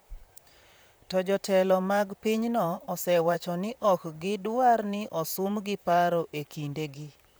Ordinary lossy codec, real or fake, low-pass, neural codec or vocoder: none; fake; none; vocoder, 44.1 kHz, 128 mel bands, Pupu-Vocoder